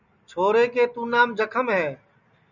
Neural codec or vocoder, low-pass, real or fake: none; 7.2 kHz; real